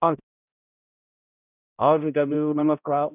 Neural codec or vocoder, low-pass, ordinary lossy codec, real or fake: codec, 16 kHz, 0.5 kbps, X-Codec, HuBERT features, trained on balanced general audio; 3.6 kHz; none; fake